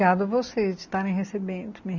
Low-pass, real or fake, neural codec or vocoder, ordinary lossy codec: 7.2 kHz; real; none; none